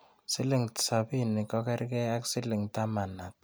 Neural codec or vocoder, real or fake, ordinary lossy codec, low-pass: none; real; none; none